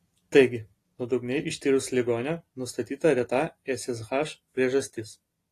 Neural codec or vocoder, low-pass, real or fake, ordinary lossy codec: none; 14.4 kHz; real; AAC, 48 kbps